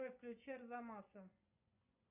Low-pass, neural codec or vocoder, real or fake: 3.6 kHz; vocoder, 24 kHz, 100 mel bands, Vocos; fake